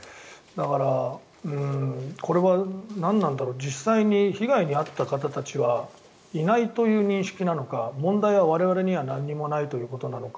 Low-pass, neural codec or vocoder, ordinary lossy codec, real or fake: none; none; none; real